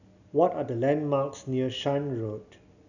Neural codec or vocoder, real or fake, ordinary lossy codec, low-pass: none; real; none; 7.2 kHz